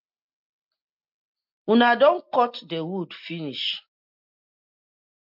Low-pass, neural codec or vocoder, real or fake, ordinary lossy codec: 5.4 kHz; none; real; MP3, 48 kbps